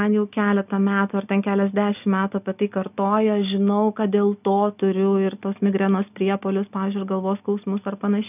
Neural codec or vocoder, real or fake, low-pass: none; real; 3.6 kHz